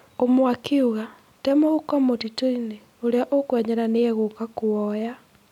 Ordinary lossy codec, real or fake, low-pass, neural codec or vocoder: none; real; 19.8 kHz; none